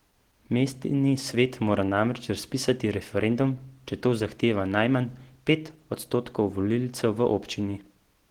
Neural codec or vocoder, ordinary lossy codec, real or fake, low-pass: none; Opus, 16 kbps; real; 19.8 kHz